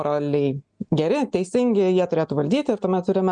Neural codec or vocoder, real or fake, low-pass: none; real; 9.9 kHz